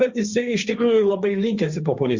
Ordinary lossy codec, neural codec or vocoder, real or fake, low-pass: Opus, 64 kbps; codec, 16 kHz, 1.1 kbps, Voila-Tokenizer; fake; 7.2 kHz